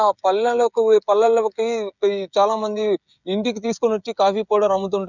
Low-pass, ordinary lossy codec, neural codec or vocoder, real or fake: 7.2 kHz; none; codec, 16 kHz, 16 kbps, FreqCodec, smaller model; fake